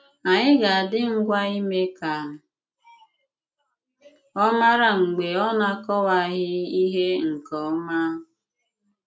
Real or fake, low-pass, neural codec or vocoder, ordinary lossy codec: real; none; none; none